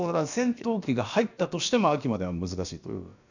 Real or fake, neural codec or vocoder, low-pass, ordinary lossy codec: fake; codec, 16 kHz, about 1 kbps, DyCAST, with the encoder's durations; 7.2 kHz; none